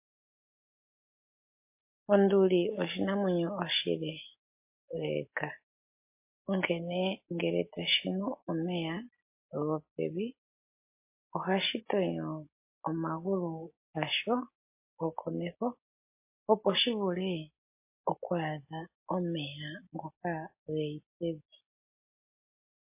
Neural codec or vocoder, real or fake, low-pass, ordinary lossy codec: none; real; 3.6 kHz; MP3, 24 kbps